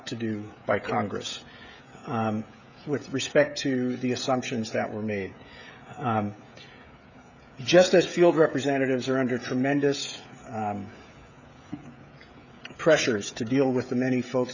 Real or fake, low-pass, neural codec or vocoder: fake; 7.2 kHz; codec, 16 kHz, 16 kbps, FreqCodec, smaller model